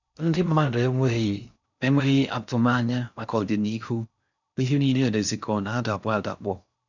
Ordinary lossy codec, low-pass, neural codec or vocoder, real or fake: none; 7.2 kHz; codec, 16 kHz in and 24 kHz out, 0.6 kbps, FocalCodec, streaming, 4096 codes; fake